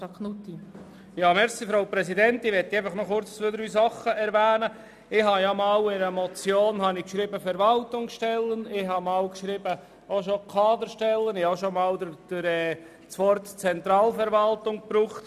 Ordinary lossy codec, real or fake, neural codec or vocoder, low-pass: none; real; none; 14.4 kHz